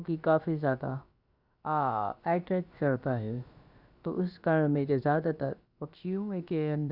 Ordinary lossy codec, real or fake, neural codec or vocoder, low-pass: Opus, 64 kbps; fake; codec, 16 kHz, about 1 kbps, DyCAST, with the encoder's durations; 5.4 kHz